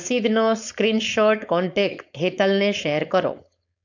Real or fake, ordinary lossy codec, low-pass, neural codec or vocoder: fake; none; 7.2 kHz; codec, 16 kHz, 4.8 kbps, FACodec